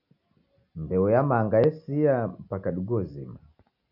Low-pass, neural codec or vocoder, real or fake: 5.4 kHz; none; real